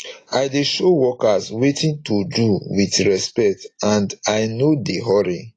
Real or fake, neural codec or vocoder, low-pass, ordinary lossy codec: real; none; 9.9 kHz; AAC, 32 kbps